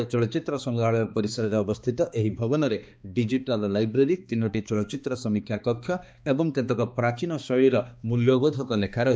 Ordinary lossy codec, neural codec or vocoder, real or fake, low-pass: none; codec, 16 kHz, 2 kbps, X-Codec, HuBERT features, trained on balanced general audio; fake; none